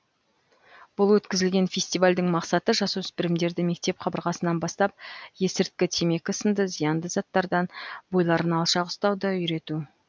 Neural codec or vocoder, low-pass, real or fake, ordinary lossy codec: none; none; real; none